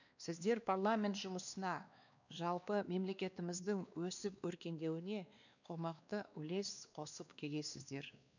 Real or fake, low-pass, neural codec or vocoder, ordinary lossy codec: fake; 7.2 kHz; codec, 16 kHz, 2 kbps, X-Codec, WavLM features, trained on Multilingual LibriSpeech; none